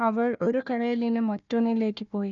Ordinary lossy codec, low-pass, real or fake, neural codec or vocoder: none; 7.2 kHz; fake; codec, 16 kHz, 1 kbps, FunCodec, trained on Chinese and English, 50 frames a second